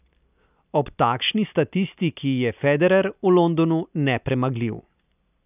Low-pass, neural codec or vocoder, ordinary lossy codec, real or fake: 3.6 kHz; none; none; real